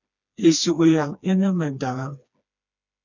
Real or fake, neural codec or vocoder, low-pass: fake; codec, 16 kHz, 2 kbps, FreqCodec, smaller model; 7.2 kHz